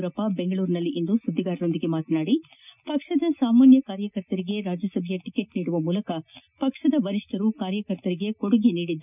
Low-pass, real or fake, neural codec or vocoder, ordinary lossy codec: 3.6 kHz; real; none; none